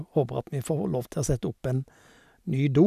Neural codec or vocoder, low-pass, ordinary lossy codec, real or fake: none; 14.4 kHz; none; real